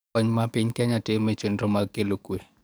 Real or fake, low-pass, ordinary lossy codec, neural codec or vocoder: fake; none; none; codec, 44.1 kHz, 7.8 kbps, DAC